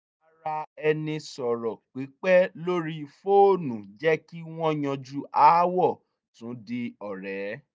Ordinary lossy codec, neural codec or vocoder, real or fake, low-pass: none; none; real; none